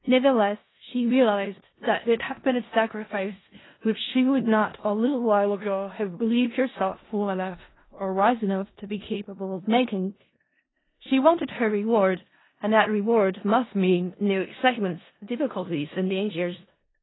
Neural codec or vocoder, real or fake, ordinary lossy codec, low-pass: codec, 16 kHz in and 24 kHz out, 0.4 kbps, LongCat-Audio-Codec, four codebook decoder; fake; AAC, 16 kbps; 7.2 kHz